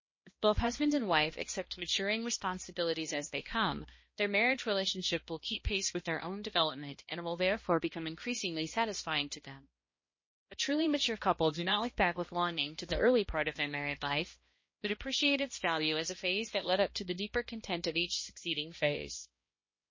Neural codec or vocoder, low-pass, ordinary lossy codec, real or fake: codec, 16 kHz, 1 kbps, X-Codec, HuBERT features, trained on balanced general audio; 7.2 kHz; MP3, 32 kbps; fake